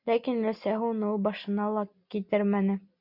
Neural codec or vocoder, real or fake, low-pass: none; real; 5.4 kHz